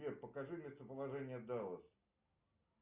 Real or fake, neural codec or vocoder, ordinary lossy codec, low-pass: real; none; Opus, 64 kbps; 3.6 kHz